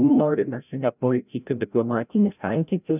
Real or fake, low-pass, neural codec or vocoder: fake; 3.6 kHz; codec, 16 kHz, 0.5 kbps, FreqCodec, larger model